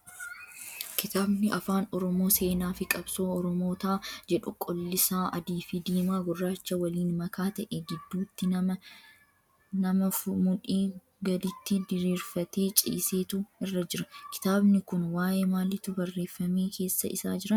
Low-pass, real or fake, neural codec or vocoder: 19.8 kHz; real; none